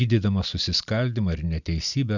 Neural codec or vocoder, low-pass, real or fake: none; 7.2 kHz; real